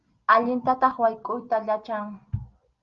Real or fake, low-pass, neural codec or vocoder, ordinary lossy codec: real; 7.2 kHz; none; Opus, 32 kbps